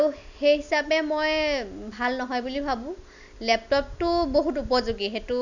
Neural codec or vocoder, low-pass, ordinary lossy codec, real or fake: none; 7.2 kHz; none; real